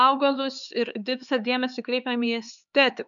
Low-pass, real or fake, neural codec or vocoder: 7.2 kHz; fake; codec, 16 kHz, 4 kbps, X-Codec, HuBERT features, trained on LibriSpeech